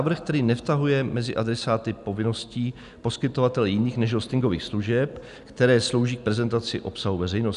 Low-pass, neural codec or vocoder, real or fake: 10.8 kHz; none; real